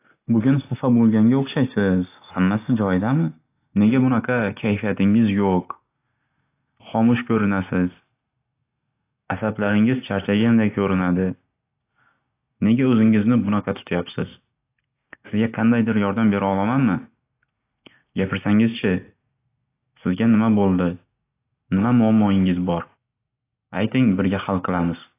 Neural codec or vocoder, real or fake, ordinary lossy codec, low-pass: none; real; AAC, 24 kbps; 3.6 kHz